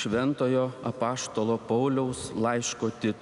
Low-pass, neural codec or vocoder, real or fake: 10.8 kHz; none; real